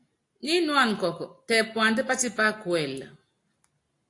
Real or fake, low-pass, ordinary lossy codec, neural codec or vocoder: real; 10.8 kHz; AAC, 48 kbps; none